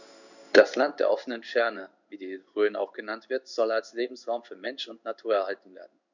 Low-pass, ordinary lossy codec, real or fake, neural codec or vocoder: 7.2 kHz; none; fake; codec, 16 kHz in and 24 kHz out, 1 kbps, XY-Tokenizer